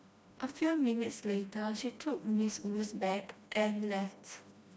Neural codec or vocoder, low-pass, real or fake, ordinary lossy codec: codec, 16 kHz, 1 kbps, FreqCodec, smaller model; none; fake; none